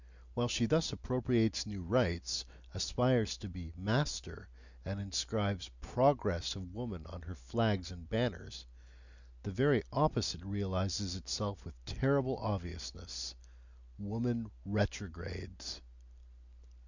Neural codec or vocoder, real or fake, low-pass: none; real; 7.2 kHz